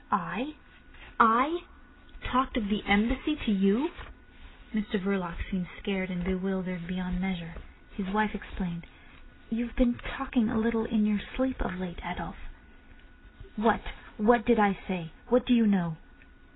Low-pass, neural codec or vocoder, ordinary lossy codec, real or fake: 7.2 kHz; none; AAC, 16 kbps; real